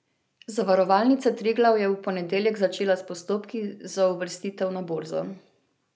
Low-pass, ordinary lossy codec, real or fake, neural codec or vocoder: none; none; real; none